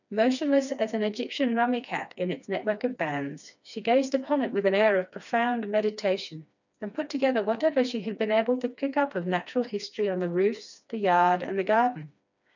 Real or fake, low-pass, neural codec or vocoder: fake; 7.2 kHz; codec, 16 kHz, 2 kbps, FreqCodec, smaller model